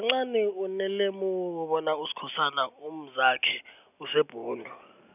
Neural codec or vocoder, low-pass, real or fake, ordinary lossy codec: none; 3.6 kHz; real; none